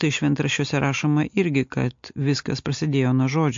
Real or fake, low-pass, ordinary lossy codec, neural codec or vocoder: real; 7.2 kHz; MP3, 48 kbps; none